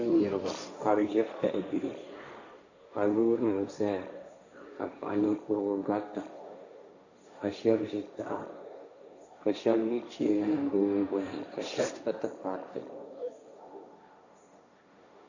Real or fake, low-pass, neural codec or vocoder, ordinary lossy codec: fake; 7.2 kHz; codec, 16 kHz, 1.1 kbps, Voila-Tokenizer; Opus, 64 kbps